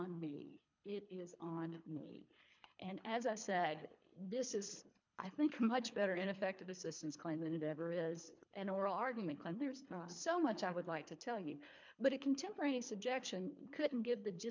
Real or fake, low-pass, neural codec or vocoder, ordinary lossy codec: fake; 7.2 kHz; codec, 24 kHz, 3 kbps, HILCodec; MP3, 64 kbps